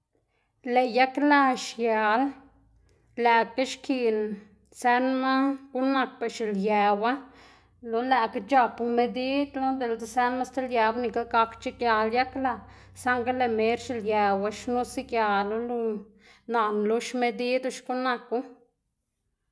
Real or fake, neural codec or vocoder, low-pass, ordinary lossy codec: real; none; none; none